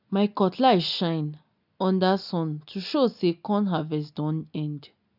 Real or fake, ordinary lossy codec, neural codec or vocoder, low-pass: real; none; none; 5.4 kHz